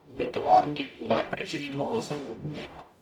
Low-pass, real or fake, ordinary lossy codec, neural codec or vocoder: 19.8 kHz; fake; none; codec, 44.1 kHz, 0.9 kbps, DAC